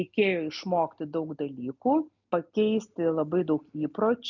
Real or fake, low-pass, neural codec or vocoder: real; 7.2 kHz; none